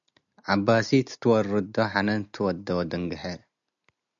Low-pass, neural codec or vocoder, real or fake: 7.2 kHz; none; real